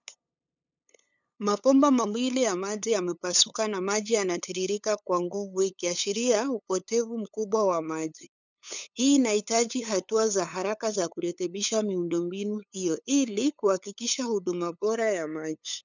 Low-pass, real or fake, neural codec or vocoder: 7.2 kHz; fake; codec, 16 kHz, 8 kbps, FunCodec, trained on LibriTTS, 25 frames a second